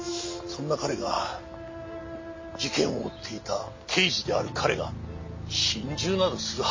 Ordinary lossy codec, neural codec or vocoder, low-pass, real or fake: MP3, 32 kbps; none; 7.2 kHz; real